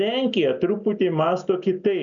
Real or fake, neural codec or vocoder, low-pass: real; none; 7.2 kHz